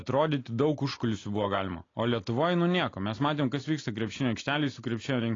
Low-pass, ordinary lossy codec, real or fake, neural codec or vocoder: 7.2 kHz; AAC, 32 kbps; real; none